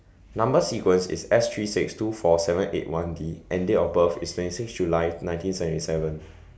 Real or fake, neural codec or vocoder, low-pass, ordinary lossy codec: real; none; none; none